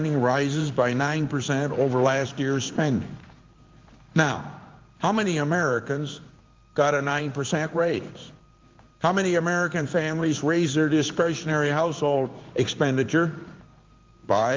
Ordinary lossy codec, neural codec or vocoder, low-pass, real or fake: Opus, 24 kbps; codec, 16 kHz in and 24 kHz out, 1 kbps, XY-Tokenizer; 7.2 kHz; fake